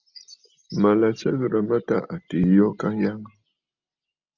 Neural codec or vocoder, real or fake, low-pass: none; real; 7.2 kHz